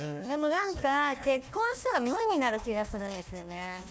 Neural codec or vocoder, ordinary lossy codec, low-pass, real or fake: codec, 16 kHz, 1 kbps, FunCodec, trained on Chinese and English, 50 frames a second; none; none; fake